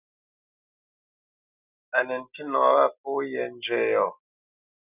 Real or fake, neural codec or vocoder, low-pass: real; none; 3.6 kHz